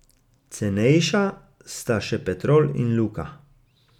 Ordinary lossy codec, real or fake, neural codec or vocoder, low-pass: none; real; none; 19.8 kHz